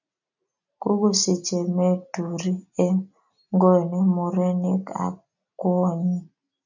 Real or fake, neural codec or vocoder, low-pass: real; none; 7.2 kHz